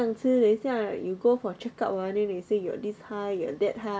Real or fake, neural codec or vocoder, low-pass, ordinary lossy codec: real; none; none; none